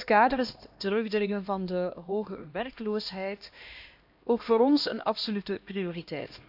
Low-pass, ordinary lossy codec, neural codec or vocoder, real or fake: 5.4 kHz; AAC, 48 kbps; codec, 16 kHz, 1 kbps, X-Codec, HuBERT features, trained on LibriSpeech; fake